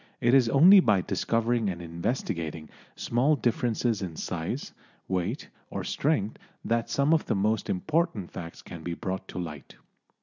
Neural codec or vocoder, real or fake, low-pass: none; real; 7.2 kHz